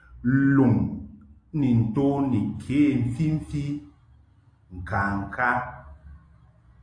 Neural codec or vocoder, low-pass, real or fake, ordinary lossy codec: none; 9.9 kHz; real; AAC, 48 kbps